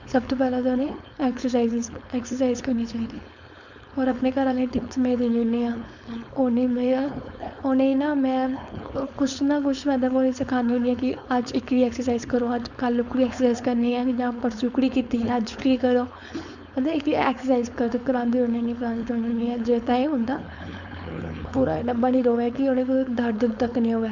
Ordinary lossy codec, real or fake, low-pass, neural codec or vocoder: none; fake; 7.2 kHz; codec, 16 kHz, 4.8 kbps, FACodec